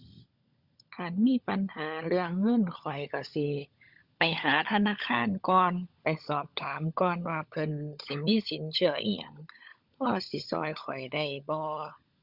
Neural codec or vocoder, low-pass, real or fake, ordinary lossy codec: codec, 16 kHz, 16 kbps, FunCodec, trained on LibriTTS, 50 frames a second; 5.4 kHz; fake; Opus, 64 kbps